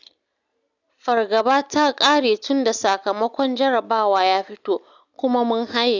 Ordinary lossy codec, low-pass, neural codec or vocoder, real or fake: none; 7.2 kHz; none; real